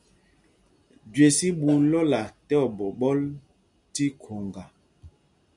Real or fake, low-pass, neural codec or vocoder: real; 10.8 kHz; none